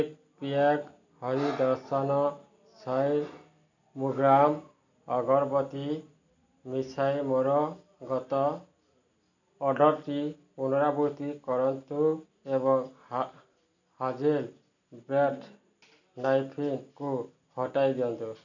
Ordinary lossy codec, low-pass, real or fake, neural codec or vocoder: none; 7.2 kHz; real; none